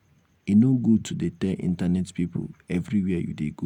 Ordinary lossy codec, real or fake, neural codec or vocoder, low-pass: MP3, 96 kbps; real; none; 19.8 kHz